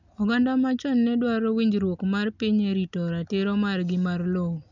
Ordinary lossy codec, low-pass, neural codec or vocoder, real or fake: none; 7.2 kHz; none; real